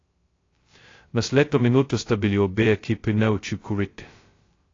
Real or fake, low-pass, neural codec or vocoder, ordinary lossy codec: fake; 7.2 kHz; codec, 16 kHz, 0.2 kbps, FocalCodec; AAC, 32 kbps